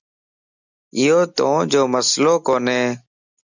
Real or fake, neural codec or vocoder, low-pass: real; none; 7.2 kHz